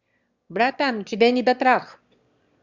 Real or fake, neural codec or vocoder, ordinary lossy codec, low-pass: fake; autoencoder, 22.05 kHz, a latent of 192 numbers a frame, VITS, trained on one speaker; Opus, 64 kbps; 7.2 kHz